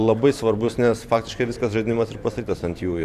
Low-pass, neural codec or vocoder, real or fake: 14.4 kHz; vocoder, 44.1 kHz, 128 mel bands every 512 samples, BigVGAN v2; fake